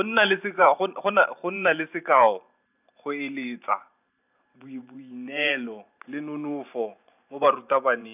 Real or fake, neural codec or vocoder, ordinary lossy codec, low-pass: fake; vocoder, 44.1 kHz, 128 mel bands every 512 samples, BigVGAN v2; none; 3.6 kHz